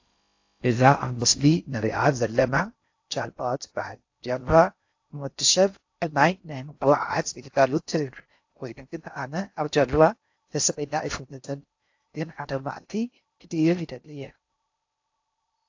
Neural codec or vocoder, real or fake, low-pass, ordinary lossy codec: codec, 16 kHz in and 24 kHz out, 0.6 kbps, FocalCodec, streaming, 4096 codes; fake; 7.2 kHz; AAC, 48 kbps